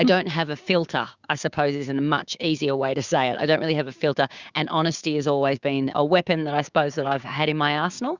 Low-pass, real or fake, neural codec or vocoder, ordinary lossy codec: 7.2 kHz; fake; codec, 16 kHz, 6 kbps, DAC; Opus, 64 kbps